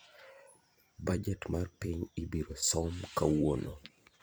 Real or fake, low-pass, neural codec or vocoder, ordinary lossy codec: fake; none; vocoder, 44.1 kHz, 128 mel bands every 256 samples, BigVGAN v2; none